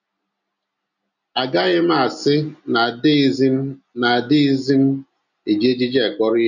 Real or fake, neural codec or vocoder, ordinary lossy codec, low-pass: real; none; none; 7.2 kHz